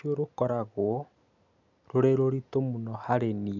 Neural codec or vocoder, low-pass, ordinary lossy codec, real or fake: none; 7.2 kHz; none; real